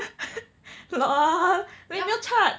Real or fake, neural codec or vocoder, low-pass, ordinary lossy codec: real; none; none; none